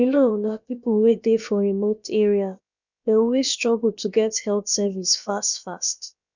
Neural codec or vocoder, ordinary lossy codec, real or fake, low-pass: codec, 16 kHz, about 1 kbps, DyCAST, with the encoder's durations; none; fake; 7.2 kHz